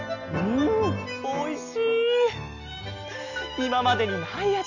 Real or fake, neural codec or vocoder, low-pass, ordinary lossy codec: real; none; 7.2 kHz; none